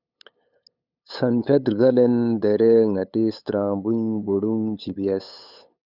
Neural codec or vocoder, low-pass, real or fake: codec, 16 kHz, 8 kbps, FunCodec, trained on LibriTTS, 25 frames a second; 5.4 kHz; fake